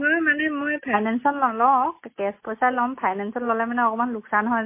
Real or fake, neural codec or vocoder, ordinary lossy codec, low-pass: real; none; MP3, 24 kbps; 3.6 kHz